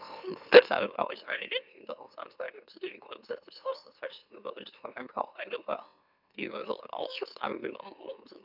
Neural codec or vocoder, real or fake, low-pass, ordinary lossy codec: autoencoder, 44.1 kHz, a latent of 192 numbers a frame, MeloTTS; fake; 5.4 kHz; none